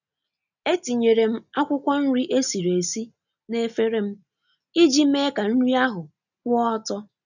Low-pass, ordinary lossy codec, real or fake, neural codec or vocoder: 7.2 kHz; none; real; none